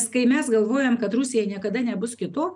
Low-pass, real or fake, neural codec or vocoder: 10.8 kHz; real; none